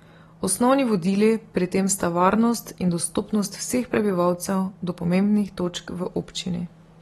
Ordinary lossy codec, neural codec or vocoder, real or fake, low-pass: AAC, 32 kbps; none; real; 19.8 kHz